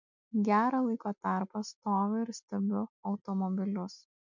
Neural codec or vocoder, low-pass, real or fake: none; 7.2 kHz; real